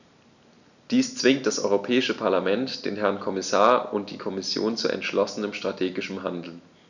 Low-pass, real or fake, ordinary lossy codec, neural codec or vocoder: 7.2 kHz; real; none; none